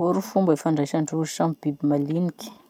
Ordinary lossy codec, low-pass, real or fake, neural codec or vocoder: none; 19.8 kHz; real; none